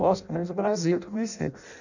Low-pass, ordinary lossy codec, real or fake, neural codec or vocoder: 7.2 kHz; none; fake; codec, 16 kHz in and 24 kHz out, 0.6 kbps, FireRedTTS-2 codec